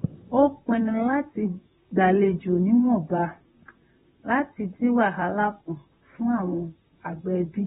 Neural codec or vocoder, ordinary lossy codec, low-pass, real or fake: vocoder, 44.1 kHz, 128 mel bands, Pupu-Vocoder; AAC, 16 kbps; 19.8 kHz; fake